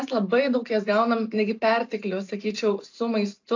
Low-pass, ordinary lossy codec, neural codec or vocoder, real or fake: 7.2 kHz; AAC, 48 kbps; none; real